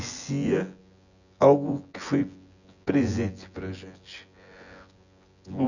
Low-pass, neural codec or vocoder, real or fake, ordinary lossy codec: 7.2 kHz; vocoder, 24 kHz, 100 mel bands, Vocos; fake; none